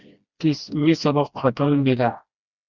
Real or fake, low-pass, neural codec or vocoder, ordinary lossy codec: fake; 7.2 kHz; codec, 16 kHz, 1 kbps, FreqCodec, smaller model; Opus, 64 kbps